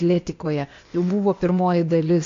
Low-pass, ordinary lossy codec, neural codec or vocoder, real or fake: 7.2 kHz; AAC, 48 kbps; codec, 16 kHz, 0.8 kbps, ZipCodec; fake